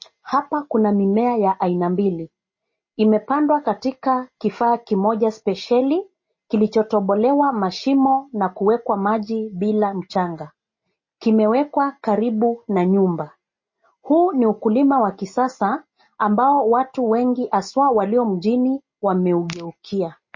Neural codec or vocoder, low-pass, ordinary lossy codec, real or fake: none; 7.2 kHz; MP3, 32 kbps; real